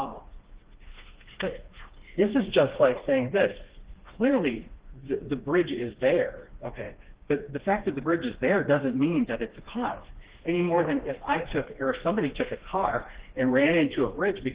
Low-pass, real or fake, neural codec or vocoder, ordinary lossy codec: 3.6 kHz; fake; codec, 16 kHz, 2 kbps, FreqCodec, smaller model; Opus, 16 kbps